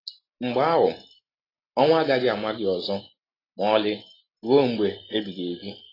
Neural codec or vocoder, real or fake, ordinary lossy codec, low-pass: none; real; AAC, 24 kbps; 5.4 kHz